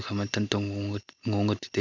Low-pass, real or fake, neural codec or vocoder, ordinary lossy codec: 7.2 kHz; real; none; none